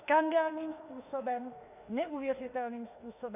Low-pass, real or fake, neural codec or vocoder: 3.6 kHz; fake; autoencoder, 48 kHz, 32 numbers a frame, DAC-VAE, trained on Japanese speech